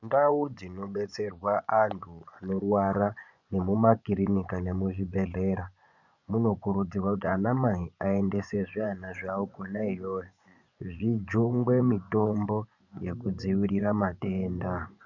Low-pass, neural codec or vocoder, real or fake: 7.2 kHz; vocoder, 24 kHz, 100 mel bands, Vocos; fake